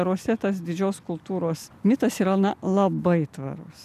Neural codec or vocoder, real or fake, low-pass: none; real; 14.4 kHz